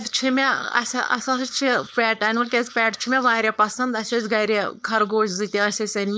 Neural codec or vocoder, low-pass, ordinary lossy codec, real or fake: codec, 16 kHz, 4.8 kbps, FACodec; none; none; fake